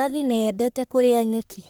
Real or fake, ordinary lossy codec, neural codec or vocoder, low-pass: fake; none; codec, 44.1 kHz, 1.7 kbps, Pupu-Codec; none